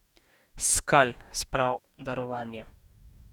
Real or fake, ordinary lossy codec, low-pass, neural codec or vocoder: fake; none; 19.8 kHz; codec, 44.1 kHz, 2.6 kbps, DAC